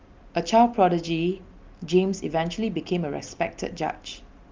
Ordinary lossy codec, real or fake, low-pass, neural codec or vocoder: Opus, 32 kbps; real; 7.2 kHz; none